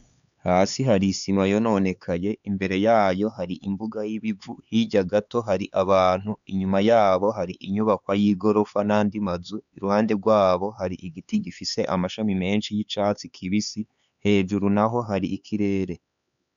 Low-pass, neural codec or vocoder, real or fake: 7.2 kHz; codec, 16 kHz, 4 kbps, X-Codec, HuBERT features, trained on LibriSpeech; fake